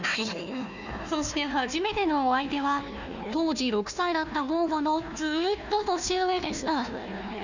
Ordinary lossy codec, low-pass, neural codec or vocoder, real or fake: none; 7.2 kHz; codec, 16 kHz, 1 kbps, FunCodec, trained on Chinese and English, 50 frames a second; fake